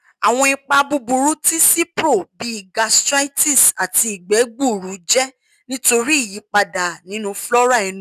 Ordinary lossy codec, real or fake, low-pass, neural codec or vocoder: none; real; 14.4 kHz; none